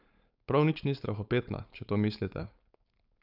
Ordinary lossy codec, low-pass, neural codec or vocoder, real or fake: none; 5.4 kHz; codec, 16 kHz, 4.8 kbps, FACodec; fake